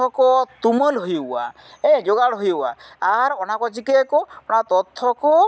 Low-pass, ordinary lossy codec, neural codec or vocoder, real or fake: none; none; none; real